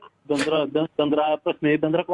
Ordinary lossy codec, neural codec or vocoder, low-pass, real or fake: MP3, 64 kbps; vocoder, 44.1 kHz, 128 mel bands every 512 samples, BigVGAN v2; 10.8 kHz; fake